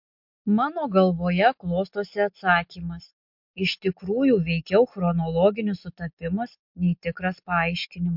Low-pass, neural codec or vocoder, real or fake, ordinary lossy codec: 5.4 kHz; none; real; MP3, 48 kbps